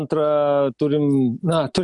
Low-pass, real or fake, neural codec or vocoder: 10.8 kHz; real; none